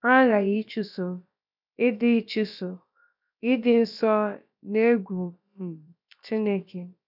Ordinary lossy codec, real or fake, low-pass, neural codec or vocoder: none; fake; 5.4 kHz; codec, 16 kHz, about 1 kbps, DyCAST, with the encoder's durations